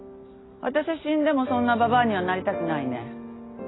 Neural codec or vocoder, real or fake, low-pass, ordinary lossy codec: none; real; 7.2 kHz; AAC, 16 kbps